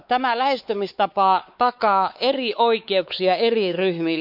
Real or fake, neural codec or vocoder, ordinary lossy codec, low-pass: fake; codec, 16 kHz, 4 kbps, X-Codec, WavLM features, trained on Multilingual LibriSpeech; none; 5.4 kHz